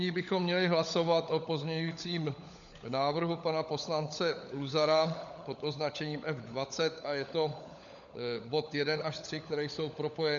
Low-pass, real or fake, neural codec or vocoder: 7.2 kHz; fake; codec, 16 kHz, 16 kbps, FunCodec, trained on LibriTTS, 50 frames a second